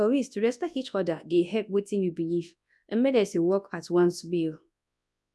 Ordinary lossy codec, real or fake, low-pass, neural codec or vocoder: none; fake; none; codec, 24 kHz, 0.9 kbps, WavTokenizer, large speech release